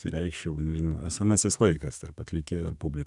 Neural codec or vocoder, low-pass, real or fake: codec, 32 kHz, 1.9 kbps, SNAC; 10.8 kHz; fake